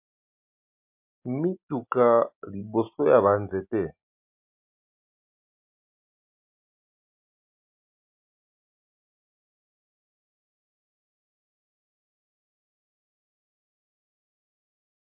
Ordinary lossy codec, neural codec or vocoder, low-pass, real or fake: MP3, 32 kbps; none; 3.6 kHz; real